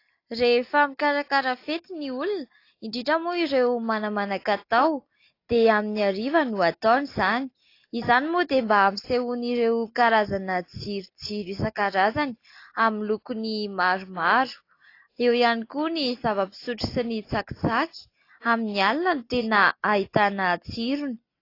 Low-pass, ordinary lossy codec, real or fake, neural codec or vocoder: 5.4 kHz; AAC, 32 kbps; real; none